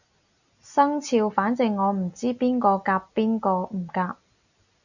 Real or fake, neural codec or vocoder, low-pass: real; none; 7.2 kHz